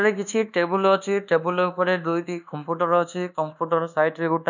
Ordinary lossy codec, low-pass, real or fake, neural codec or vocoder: none; 7.2 kHz; fake; codec, 24 kHz, 1.2 kbps, DualCodec